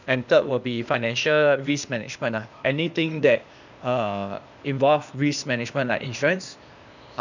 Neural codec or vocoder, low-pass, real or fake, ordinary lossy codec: codec, 16 kHz, 0.8 kbps, ZipCodec; 7.2 kHz; fake; none